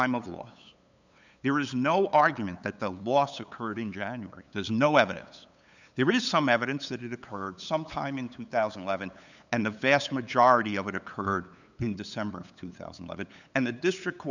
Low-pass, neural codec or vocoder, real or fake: 7.2 kHz; codec, 16 kHz, 8 kbps, FunCodec, trained on LibriTTS, 25 frames a second; fake